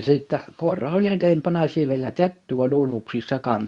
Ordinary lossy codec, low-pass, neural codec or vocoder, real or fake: Opus, 64 kbps; 10.8 kHz; codec, 24 kHz, 0.9 kbps, WavTokenizer, medium speech release version 2; fake